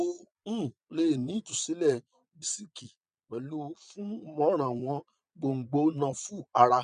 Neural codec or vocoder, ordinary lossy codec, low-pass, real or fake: vocoder, 22.05 kHz, 80 mel bands, Vocos; none; 9.9 kHz; fake